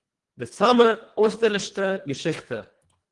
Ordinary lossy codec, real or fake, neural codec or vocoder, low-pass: Opus, 24 kbps; fake; codec, 24 kHz, 1.5 kbps, HILCodec; 10.8 kHz